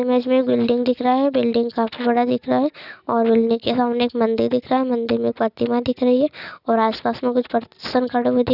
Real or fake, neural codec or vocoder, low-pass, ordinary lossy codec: real; none; 5.4 kHz; none